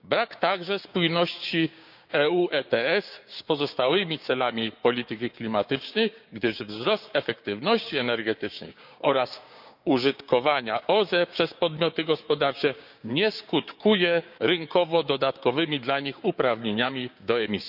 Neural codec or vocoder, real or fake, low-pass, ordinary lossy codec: codec, 16 kHz, 6 kbps, DAC; fake; 5.4 kHz; none